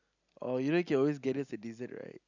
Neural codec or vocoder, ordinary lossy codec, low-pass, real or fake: none; none; 7.2 kHz; real